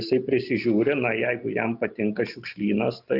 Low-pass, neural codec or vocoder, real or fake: 5.4 kHz; none; real